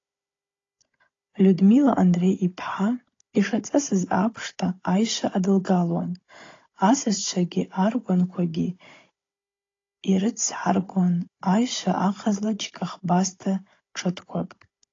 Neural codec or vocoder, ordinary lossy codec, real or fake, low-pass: codec, 16 kHz, 16 kbps, FunCodec, trained on Chinese and English, 50 frames a second; AAC, 32 kbps; fake; 7.2 kHz